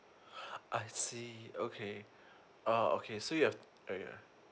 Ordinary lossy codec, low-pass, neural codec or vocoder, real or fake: none; none; none; real